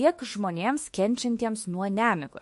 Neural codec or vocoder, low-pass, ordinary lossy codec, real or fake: autoencoder, 48 kHz, 32 numbers a frame, DAC-VAE, trained on Japanese speech; 14.4 kHz; MP3, 48 kbps; fake